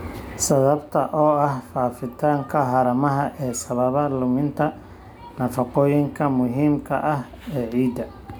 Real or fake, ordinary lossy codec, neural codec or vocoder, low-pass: real; none; none; none